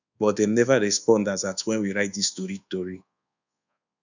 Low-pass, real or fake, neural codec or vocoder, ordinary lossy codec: 7.2 kHz; fake; codec, 24 kHz, 1.2 kbps, DualCodec; none